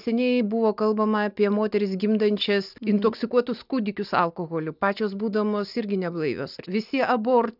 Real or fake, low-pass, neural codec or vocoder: real; 5.4 kHz; none